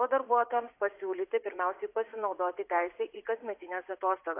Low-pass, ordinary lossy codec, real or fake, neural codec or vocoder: 3.6 kHz; AAC, 24 kbps; fake; vocoder, 44.1 kHz, 80 mel bands, Vocos